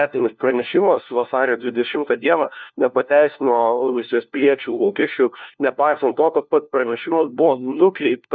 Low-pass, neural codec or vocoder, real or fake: 7.2 kHz; codec, 16 kHz, 1 kbps, FunCodec, trained on LibriTTS, 50 frames a second; fake